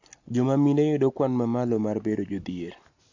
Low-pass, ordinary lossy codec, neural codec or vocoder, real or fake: 7.2 kHz; AAC, 48 kbps; none; real